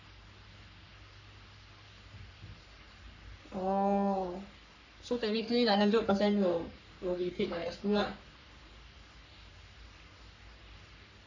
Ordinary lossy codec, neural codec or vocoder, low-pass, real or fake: AAC, 48 kbps; codec, 44.1 kHz, 3.4 kbps, Pupu-Codec; 7.2 kHz; fake